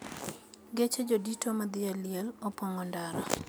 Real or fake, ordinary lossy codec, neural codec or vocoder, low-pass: real; none; none; none